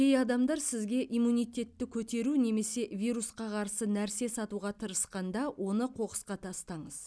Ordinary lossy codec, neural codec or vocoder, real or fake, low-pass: none; none; real; none